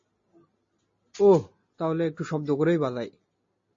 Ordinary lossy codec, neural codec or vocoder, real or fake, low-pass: MP3, 32 kbps; none; real; 7.2 kHz